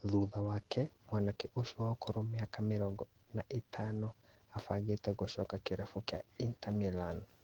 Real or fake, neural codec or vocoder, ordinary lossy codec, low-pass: fake; autoencoder, 48 kHz, 128 numbers a frame, DAC-VAE, trained on Japanese speech; Opus, 16 kbps; 19.8 kHz